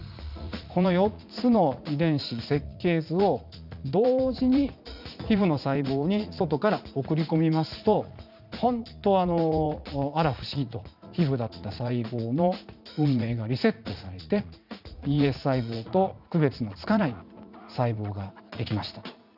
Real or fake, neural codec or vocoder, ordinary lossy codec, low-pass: fake; vocoder, 44.1 kHz, 80 mel bands, Vocos; none; 5.4 kHz